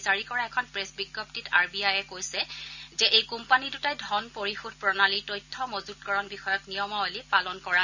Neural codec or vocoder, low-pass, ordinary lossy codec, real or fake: none; 7.2 kHz; none; real